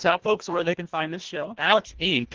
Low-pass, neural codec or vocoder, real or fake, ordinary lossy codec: 7.2 kHz; codec, 24 kHz, 1.5 kbps, HILCodec; fake; Opus, 16 kbps